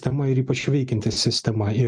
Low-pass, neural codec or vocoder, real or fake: 9.9 kHz; none; real